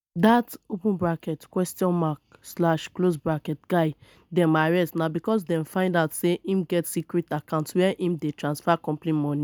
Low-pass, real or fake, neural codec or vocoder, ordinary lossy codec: none; real; none; none